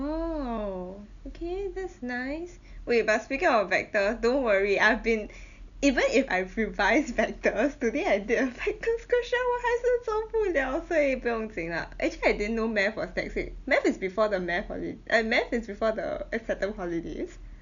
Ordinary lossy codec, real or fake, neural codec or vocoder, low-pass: none; real; none; 7.2 kHz